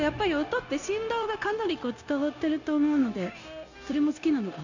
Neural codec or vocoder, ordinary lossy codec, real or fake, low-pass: codec, 16 kHz, 0.9 kbps, LongCat-Audio-Codec; none; fake; 7.2 kHz